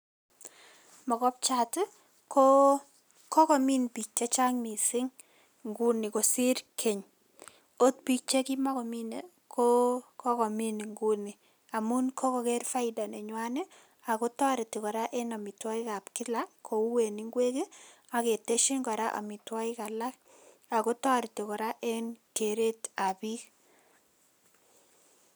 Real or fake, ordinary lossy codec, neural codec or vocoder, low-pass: real; none; none; none